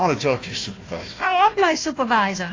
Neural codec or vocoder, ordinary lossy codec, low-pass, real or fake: codec, 16 kHz, 1 kbps, FunCodec, trained on Chinese and English, 50 frames a second; AAC, 32 kbps; 7.2 kHz; fake